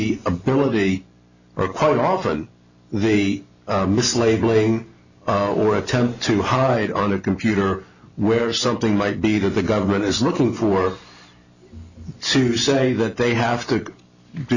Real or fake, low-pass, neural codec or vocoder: real; 7.2 kHz; none